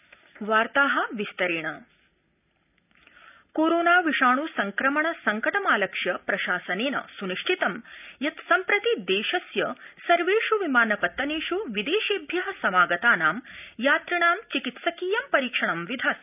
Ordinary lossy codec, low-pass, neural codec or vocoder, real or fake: none; 3.6 kHz; none; real